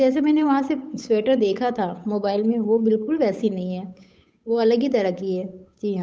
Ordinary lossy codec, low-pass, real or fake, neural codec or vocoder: none; none; fake; codec, 16 kHz, 8 kbps, FunCodec, trained on Chinese and English, 25 frames a second